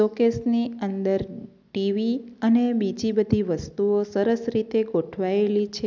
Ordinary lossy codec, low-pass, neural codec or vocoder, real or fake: none; 7.2 kHz; none; real